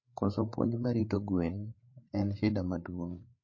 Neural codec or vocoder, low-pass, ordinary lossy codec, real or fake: codec, 16 kHz, 8 kbps, FreqCodec, larger model; 7.2 kHz; MP3, 32 kbps; fake